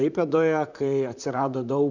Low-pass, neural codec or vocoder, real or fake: 7.2 kHz; vocoder, 44.1 kHz, 128 mel bands, Pupu-Vocoder; fake